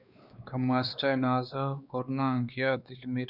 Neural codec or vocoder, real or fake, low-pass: codec, 16 kHz, 2 kbps, X-Codec, WavLM features, trained on Multilingual LibriSpeech; fake; 5.4 kHz